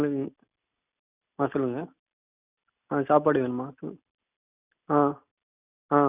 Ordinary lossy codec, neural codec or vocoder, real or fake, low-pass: none; none; real; 3.6 kHz